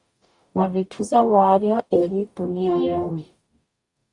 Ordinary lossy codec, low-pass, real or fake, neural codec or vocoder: Opus, 64 kbps; 10.8 kHz; fake; codec, 44.1 kHz, 0.9 kbps, DAC